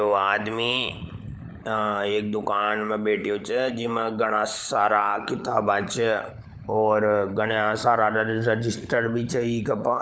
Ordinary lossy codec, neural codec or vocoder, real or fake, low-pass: none; codec, 16 kHz, 16 kbps, FunCodec, trained on LibriTTS, 50 frames a second; fake; none